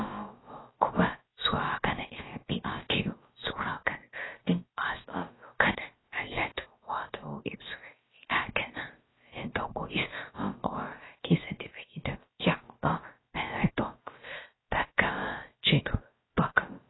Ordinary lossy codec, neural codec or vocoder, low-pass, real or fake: AAC, 16 kbps; codec, 16 kHz, about 1 kbps, DyCAST, with the encoder's durations; 7.2 kHz; fake